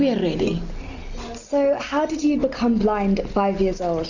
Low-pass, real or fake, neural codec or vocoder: 7.2 kHz; real; none